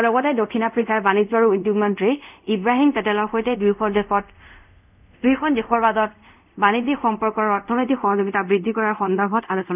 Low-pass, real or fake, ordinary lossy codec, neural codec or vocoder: 3.6 kHz; fake; none; codec, 24 kHz, 0.5 kbps, DualCodec